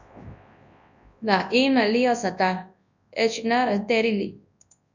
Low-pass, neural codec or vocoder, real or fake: 7.2 kHz; codec, 24 kHz, 0.9 kbps, WavTokenizer, large speech release; fake